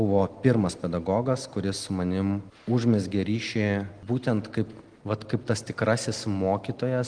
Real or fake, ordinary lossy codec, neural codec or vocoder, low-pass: real; Opus, 24 kbps; none; 9.9 kHz